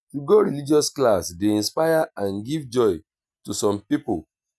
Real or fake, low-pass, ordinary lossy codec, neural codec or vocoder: real; none; none; none